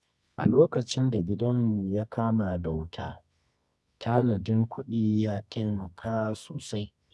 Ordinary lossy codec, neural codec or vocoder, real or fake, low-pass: none; codec, 24 kHz, 0.9 kbps, WavTokenizer, medium music audio release; fake; none